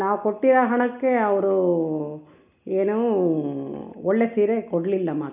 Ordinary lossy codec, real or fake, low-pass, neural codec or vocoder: none; real; 3.6 kHz; none